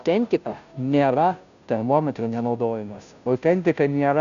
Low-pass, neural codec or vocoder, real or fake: 7.2 kHz; codec, 16 kHz, 0.5 kbps, FunCodec, trained on Chinese and English, 25 frames a second; fake